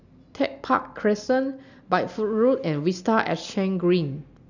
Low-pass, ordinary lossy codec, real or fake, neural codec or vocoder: 7.2 kHz; none; real; none